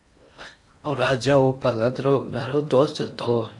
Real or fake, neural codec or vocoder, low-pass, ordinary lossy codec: fake; codec, 16 kHz in and 24 kHz out, 0.6 kbps, FocalCodec, streaming, 4096 codes; 10.8 kHz; AAC, 64 kbps